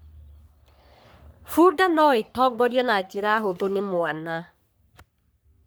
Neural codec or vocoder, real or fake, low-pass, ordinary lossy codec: codec, 44.1 kHz, 3.4 kbps, Pupu-Codec; fake; none; none